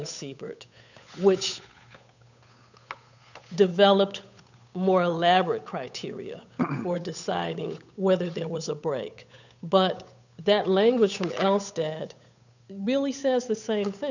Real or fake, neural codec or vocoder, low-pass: fake; codec, 16 kHz, 8 kbps, FunCodec, trained on Chinese and English, 25 frames a second; 7.2 kHz